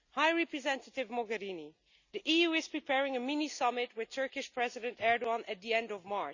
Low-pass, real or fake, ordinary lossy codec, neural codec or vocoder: 7.2 kHz; real; Opus, 64 kbps; none